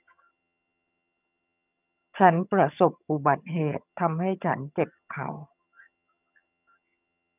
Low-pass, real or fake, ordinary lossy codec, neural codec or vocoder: 3.6 kHz; fake; none; vocoder, 22.05 kHz, 80 mel bands, HiFi-GAN